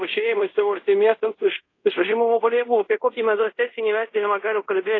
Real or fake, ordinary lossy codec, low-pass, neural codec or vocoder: fake; AAC, 32 kbps; 7.2 kHz; codec, 24 kHz, 0.5 kbps, DualCodec